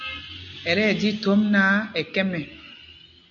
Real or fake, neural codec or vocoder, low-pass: real; none; 7.2 kHz